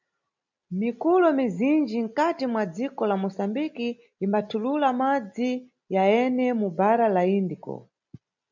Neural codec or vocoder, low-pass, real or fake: none; 7.2 kHz; real